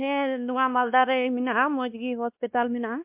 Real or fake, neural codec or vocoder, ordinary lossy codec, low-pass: fake; codec, 16 kHz, 2 kbps, X-Codec, WavLM features, trained on Multilingual LibriSpeech; MP3, 32 kbps; 3.6 kHz